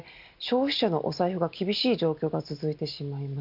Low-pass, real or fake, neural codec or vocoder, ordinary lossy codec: 5.4 kHz; real; none; none